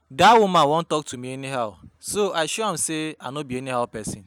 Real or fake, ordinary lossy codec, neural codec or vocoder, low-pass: real; none; none; none